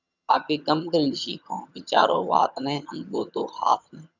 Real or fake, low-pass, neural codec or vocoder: fake; 7.2 kHz; vocoder, 22.05 kHz, 80 mel bands, HiFi-GAN